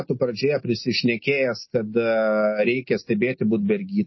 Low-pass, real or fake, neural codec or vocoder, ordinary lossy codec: 7.2 kHz; real; none; MP3, 24 kbps